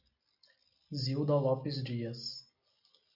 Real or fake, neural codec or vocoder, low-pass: real; none; 5.4 kHz